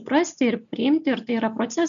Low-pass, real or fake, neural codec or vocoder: 7.2 kHz; real; none